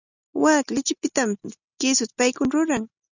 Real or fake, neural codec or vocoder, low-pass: real; none; 7.2 kHz